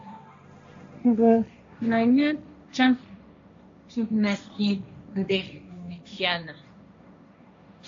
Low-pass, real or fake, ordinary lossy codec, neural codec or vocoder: 7.2 kHz; fake; AAC, 64 kbps; codec, 16 kHz, 1.1 kbps, Voila-Tokenizer